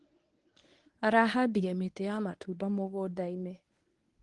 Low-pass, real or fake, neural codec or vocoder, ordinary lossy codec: 10.8 kHz; fake; codec, 24 kHz, 0.9 kbps, WavTokenizer, medium speech release version 1; Opus, 24 kbps